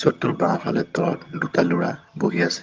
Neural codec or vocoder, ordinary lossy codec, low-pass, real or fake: vocoder, 22.05 kHz, 80 mel bands, HiFi-GAN; Opus, 32 kbps; 7.2 kHz; fake